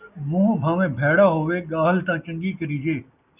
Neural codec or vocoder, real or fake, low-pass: none; real; 3.6 kHz